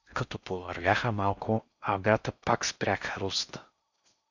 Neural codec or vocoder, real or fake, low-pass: codec, 16 kHz in and 24 kHz out, 0.6 kbps, FocalCodec, streaming, 4096 codes; fake; 7.2 kHz